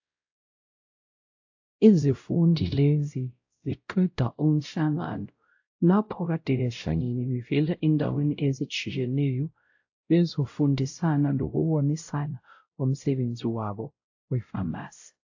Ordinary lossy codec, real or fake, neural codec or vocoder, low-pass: AAC, 48 kbps; fake; codec, 16 kHz, 0.5 kbps, X-Codec, WavLM features, trained on Multilingual LibriSpeech; 7.2 kHz